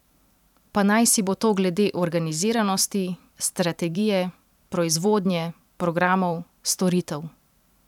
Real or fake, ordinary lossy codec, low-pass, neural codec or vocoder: fake; none; 19.8 kHz; vocoder, 44.1 kHz, 128 mel bands every 512 samples, BigVGAN v2